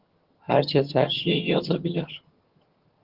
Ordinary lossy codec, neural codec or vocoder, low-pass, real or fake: Opus, 16 kbps; vocoder, 22.05 kHz, 80 mel bands, HiFi-GAN; 5.4 kHz; fake